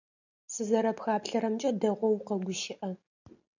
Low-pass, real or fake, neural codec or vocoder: 7.2 kHz; real; none